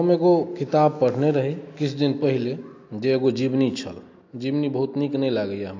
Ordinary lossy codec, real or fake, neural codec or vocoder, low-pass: AAC, 48 kbps; real; none; 7.2 kHz